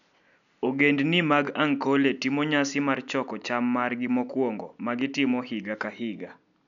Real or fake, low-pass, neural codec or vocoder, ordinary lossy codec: real; 7.2 kHz; none; none